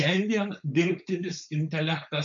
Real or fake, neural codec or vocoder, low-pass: fake; codec, 16 kHz, 4.8 kbps, FACodec; 7.2 kHz